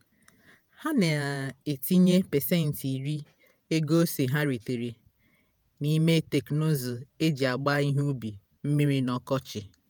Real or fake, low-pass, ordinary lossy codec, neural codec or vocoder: fake; none; none; vocoder, 48 kHz, 128 mel bands, Vocos